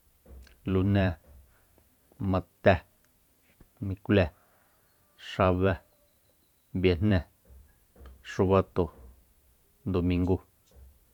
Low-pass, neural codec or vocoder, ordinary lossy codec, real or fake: 19.8 kHz; vocoder, 44.1 kHz, 128 mel bands every 512 samples, BigVGAN v2; none; fake